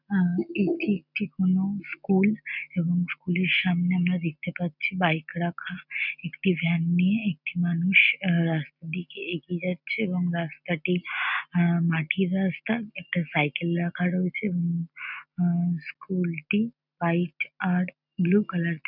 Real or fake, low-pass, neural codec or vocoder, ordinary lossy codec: real; 5.4 kHz; none; none